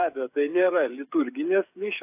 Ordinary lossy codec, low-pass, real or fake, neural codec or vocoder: AAC, 32 kbps; 3.6 kHz; fake; codec, 16 kHz, 8 kbps, FreqCodec, smaller model